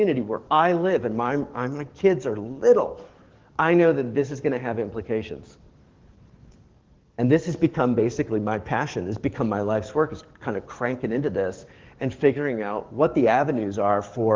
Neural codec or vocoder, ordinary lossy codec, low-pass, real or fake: codec, 44.1 kHz, 7.8 kbps, DAC; Opus, 16 kbps; 7.2 kHz; fake